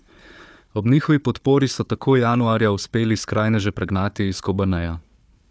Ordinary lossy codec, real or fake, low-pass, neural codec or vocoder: none; fake; none; codec, 16 kHz, 4 kbps, FunCodec, trained on Chinese and English, 50 frames a second